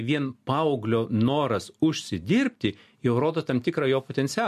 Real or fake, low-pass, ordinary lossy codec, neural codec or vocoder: real; 14.4 kHz; MP3, 64 kbps; none